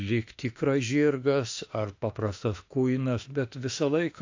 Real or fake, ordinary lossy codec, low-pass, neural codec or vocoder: fake; AAC, 48 kbps; 7.2 kHz; autoencoder, 48 kHz, 32 numbers a frame, DAC-VAE, trained on Japanese speech